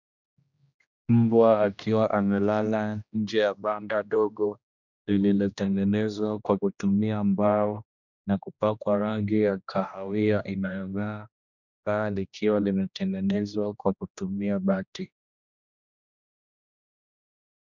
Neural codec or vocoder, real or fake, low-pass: codec, 16 kHz, 1 kbps, X-Codec, HuBERT features, trained on general audio; fake; 7.2 kHz